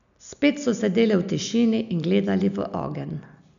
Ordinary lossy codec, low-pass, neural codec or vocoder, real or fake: none; 7.2 kHz; none; real